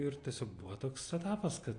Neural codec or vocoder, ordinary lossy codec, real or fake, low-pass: none; AAC, 48 kbps; real; 9.9 kHz